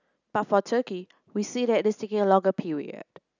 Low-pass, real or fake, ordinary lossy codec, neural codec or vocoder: 7.2 kHz; real; none; none